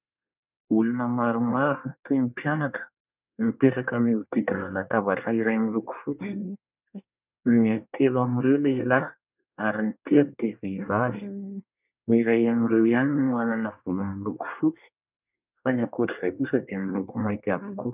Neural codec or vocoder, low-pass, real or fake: codec, 24 kHz, 1 kbps, SNAC; 3.6 kHz; fake